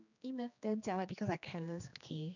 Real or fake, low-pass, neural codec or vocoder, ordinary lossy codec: fake; 7.2 kHz; codec, 16 kHz, 2 kbps, X-Codec, HuBERT features, trained on general audio; none